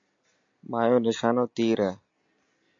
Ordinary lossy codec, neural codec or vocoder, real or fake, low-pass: MP3, 48 kbps; none; real; 7.2 kHz